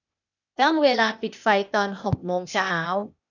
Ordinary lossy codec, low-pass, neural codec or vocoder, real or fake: none; 7.2 kHz; codec, 16 kHz, 0.8 kbps, ZipCodec; fake